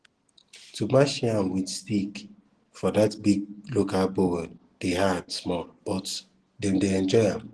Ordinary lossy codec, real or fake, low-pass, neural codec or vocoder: Opus, 16 kbps; real; 10.8 kHz; none